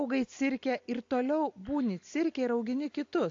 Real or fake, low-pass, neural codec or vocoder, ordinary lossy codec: real; 7.2 kHz; none; AAC, 48 kbps